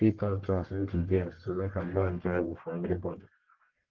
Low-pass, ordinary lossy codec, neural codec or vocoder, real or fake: 7.2 kHz; Opus, 16 kbps; codec, 44.1 kHz, 1.7 kbps, Pupu-Codec; fake